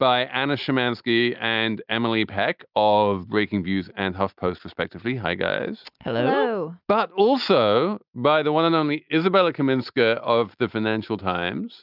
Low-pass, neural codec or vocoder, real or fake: 5.4 kHz; none; real